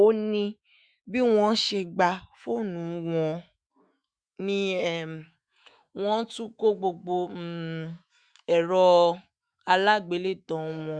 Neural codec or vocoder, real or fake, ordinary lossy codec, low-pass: autoencoder, 48 kHz, 128 numbers a frame, DAC-VAE, trained on Japanese speech; fake; Opus, 64 kbps; 9.9 kHz